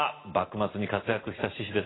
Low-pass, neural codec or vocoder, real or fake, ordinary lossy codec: 7.2 kHz; none; real; AAC, 16 kbps